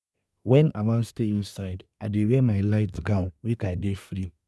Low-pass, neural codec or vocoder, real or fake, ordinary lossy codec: none; codec, 24 kHz, 1 kbps, SNAC; fake; none